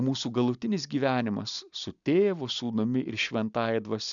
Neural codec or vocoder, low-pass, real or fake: none; 7.2 kHz; real